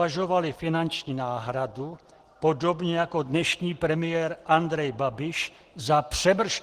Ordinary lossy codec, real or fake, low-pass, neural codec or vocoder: Opus, 16 kbps; real; 14.4 kHz; none